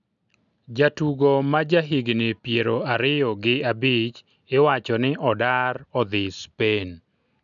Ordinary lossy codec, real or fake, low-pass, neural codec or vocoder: none; real; 7.2 kHz; none